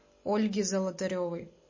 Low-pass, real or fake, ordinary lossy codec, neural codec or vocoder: 7.2 kHz; real; MP3, 32 kbps; none